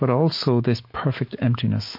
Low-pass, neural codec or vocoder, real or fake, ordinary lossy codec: 5.4 kHz; autoencoder, 48 kHz, 128 numbers a frame, DAC-VAE, trained on Japanese speech; fake; MP3, 32 kbps